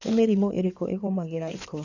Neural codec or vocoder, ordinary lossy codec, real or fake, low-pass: codec, 16 kHz in and 24 kHz out, 2.2 kbps, FireRedTTS-2 codec; none; fake; 7.2 kHz